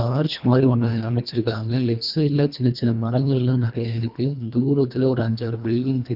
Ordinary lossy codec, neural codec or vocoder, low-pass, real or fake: none; codec, 24 kHz, 1.5 kbps, HILCodec; 5.4 kHz; fake